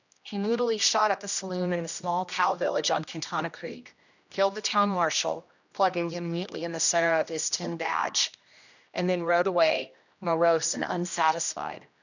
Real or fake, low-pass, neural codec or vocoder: fake; 7.2 kHz; codec, 16 kHz, 1 kbps, X-Codec, HuBERT features, trained on general audio